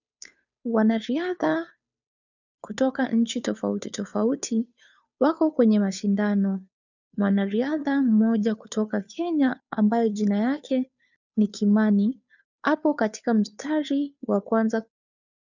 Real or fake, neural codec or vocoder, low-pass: fake; codec, 16 kHz, 2 kbps, FunCodec, trained on Chinese and English, 25 frames a second; 7.2 kHz